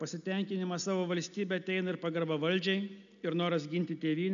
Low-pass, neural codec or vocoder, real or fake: 7.2 kHz; none; real